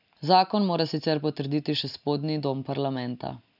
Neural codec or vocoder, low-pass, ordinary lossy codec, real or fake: none; 5.4 kHz; none; real